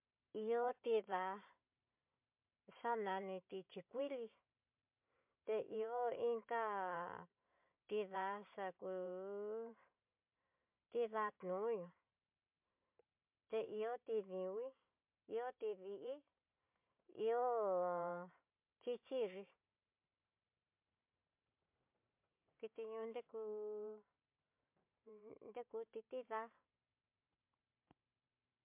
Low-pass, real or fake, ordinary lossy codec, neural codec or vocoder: 3.6 kHz; fake; MP3, 32 kbps; vocoder, 44.1 kHz, 128 mel bands every 512 samples, BigVGAN v2